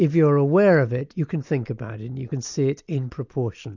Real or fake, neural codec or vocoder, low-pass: real; none; 7.2 kHz